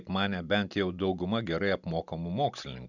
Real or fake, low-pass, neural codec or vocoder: real; 7.2 kHz; none